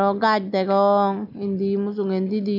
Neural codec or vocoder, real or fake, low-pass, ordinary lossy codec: none; real; 5.4 kHz; none